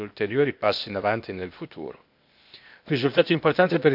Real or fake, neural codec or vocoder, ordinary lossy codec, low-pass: fake; codec, 16 kHz, 0.8 kbps, ZipCodec; none; 5.4 kHz